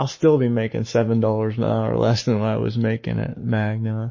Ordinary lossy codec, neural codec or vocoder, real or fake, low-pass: MP3, 32 kbps; codec, 44.1 kHz, 7.8 kbps, Pupu-Codec; fake; 7.2 kHz